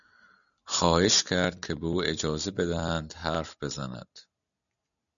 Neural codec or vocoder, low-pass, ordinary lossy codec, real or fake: none; 7.2 kHz; MP3, 96 kbps; real